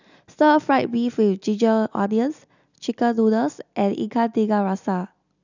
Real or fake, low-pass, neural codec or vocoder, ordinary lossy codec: real; 7.2 kHz; none; none